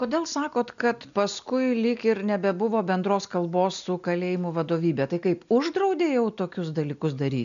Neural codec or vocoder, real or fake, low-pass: none; real; 7.2 kHz